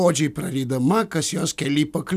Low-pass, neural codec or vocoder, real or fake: 14.4 kHz; none; real